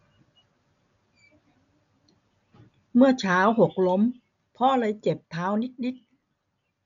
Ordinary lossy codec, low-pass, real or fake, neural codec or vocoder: MP3, 96 kbps; 7.2 kHz; real; none